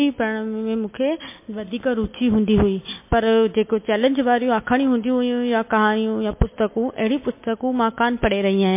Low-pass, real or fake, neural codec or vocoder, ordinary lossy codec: 3.6 kHz; real; none; MP3, 24 kbps